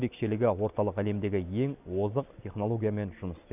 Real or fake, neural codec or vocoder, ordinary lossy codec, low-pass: real; none; none; 3.6 kHz